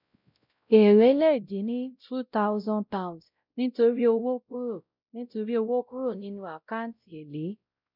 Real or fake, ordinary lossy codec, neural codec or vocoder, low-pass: fake; none; codec, 16 kHz, 0.5 kbps, X-Codec, WavLM features, trained on Multilingual LibriSpeech; 5.4 kHz